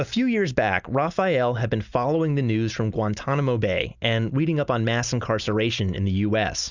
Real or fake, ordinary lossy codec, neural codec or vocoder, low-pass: real; Opus, 64 kbps; none; 7.2 kHz